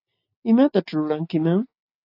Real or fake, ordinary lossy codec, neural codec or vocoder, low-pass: real; AAC, 32 kbps; none; 5.4 kHz